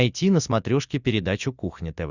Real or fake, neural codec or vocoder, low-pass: real; none; 7.2 kHz